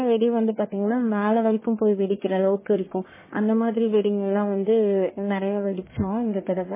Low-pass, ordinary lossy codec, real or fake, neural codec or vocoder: 3.6 kHz; MP3, 16 kbps; fake; codec, 44.1 kHz, 1.7 kbps, Pupu-Codec